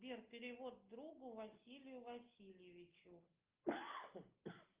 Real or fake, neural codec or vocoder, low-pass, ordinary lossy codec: real; none; 3.6 kHz; Opus, 32 kbps